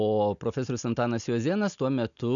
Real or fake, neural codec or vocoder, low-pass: real; none; 7.2 kHz